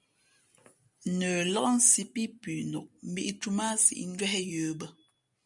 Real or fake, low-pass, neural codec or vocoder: real; 10.8 kHz; none